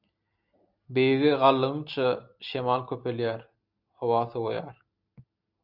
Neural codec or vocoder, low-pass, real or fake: none; 5.4 kHz; real